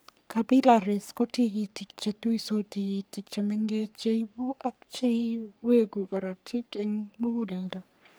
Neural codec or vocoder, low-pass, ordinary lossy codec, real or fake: codec, 44.1 kHz, 3.4 kbps, Pupu-Codec; none; none; fake